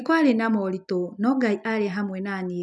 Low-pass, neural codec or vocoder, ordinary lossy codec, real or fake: none; none; none; real